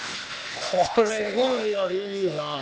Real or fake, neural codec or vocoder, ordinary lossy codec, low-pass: fake; codec, 16 kHz, 0.8 kbps, ZipCodec; none; none